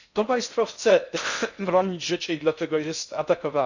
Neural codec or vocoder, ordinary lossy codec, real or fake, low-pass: codec, 16 kHz in and 24 kHz out, 0.6 kbps, FocalCodec, streaming, 4096 codes; none; fake; 7.2 kHz